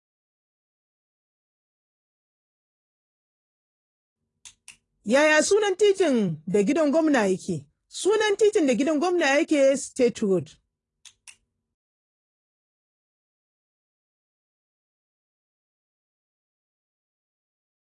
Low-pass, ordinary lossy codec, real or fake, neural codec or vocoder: 10.8 kHz; AAC, 32 kbps; fake; vocoder, 44.1 kHz, 128 mel bands every 256 samples, BigVGAN v2